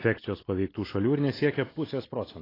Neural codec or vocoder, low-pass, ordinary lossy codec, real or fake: none; 5.4 kHz; AAC, 24 kbps; real